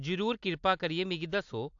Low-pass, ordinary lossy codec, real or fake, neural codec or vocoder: 7.2 kHz; AAC, 64 kbps; real; none